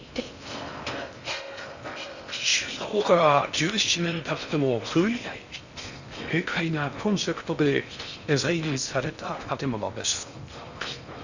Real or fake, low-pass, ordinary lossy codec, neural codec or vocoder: fake; 7.2 kHz; Opus, 64 kbps; codec, 16 kHz in and 24 kHz out, 0.6 kbps, FocalCodec, streaming, 4096 codes